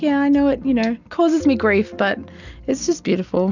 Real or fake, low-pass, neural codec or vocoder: real; 7.2 kHz; none